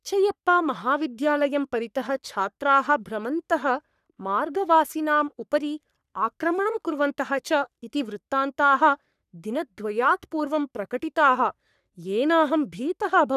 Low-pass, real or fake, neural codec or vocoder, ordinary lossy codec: 14.4 kHz; fake; codec, 44.1 kHz, 3.4 kbps, Pupu-Codec; none